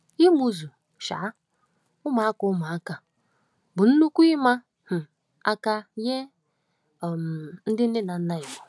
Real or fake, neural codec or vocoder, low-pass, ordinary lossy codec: real; none; none; none